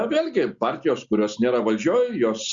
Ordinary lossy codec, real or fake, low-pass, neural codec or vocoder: Opus, 64 kbps; real; 7.2 kHz; none